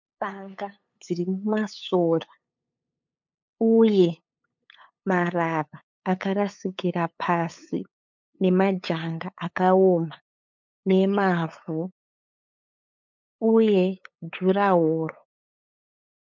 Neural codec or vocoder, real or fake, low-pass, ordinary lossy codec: codec, 16 kHz, 8 kbps, FunCodec, trained on LibriTTS, 25 frames a second; fake; 7.2 kHz; MP3, 64 kbps